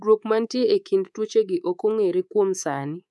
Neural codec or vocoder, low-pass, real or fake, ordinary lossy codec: codec, 24 kHz, 3.1 kbps, DualCodec; none; fake; none